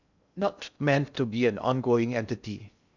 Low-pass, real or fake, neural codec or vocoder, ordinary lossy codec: 7.2 kHz; fake; codec, 16 kHz in and 24 kHz out, 0.6 kbps, FocalCodec, streaming, 2048 codes; none